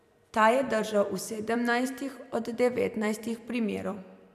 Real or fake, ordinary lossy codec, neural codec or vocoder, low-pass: fake; none; vocoder, 44.1 kHz, 128 mel bands every 256 samples, BigVGAN v2; 14.4 kHz